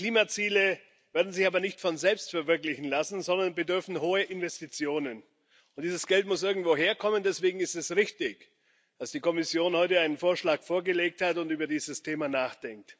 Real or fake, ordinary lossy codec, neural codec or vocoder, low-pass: real; none; none; none